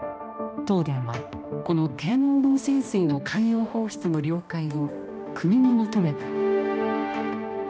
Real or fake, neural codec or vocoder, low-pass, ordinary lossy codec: fake; codec, 16 kHz, 1 kbps, X-Codec, HuBERT features, trained on balanced general audio; none; none